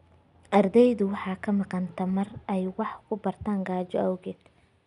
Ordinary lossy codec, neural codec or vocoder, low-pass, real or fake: none; none; 10.8 kHz; real